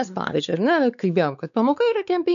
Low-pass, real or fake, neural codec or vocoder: 7.2 kHz; fake; codec, 16 kHz, 4 kbps, X-Codec, WavLM features, trained on Multilingual LibriSpeech